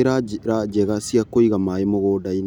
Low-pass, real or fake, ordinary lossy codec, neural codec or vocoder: 19.8 kHz; real; none; none